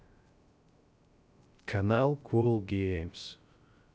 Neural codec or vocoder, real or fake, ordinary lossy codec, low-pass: codec, 16 kHz, 0.3 kbps, FocalCodec; fake; none; none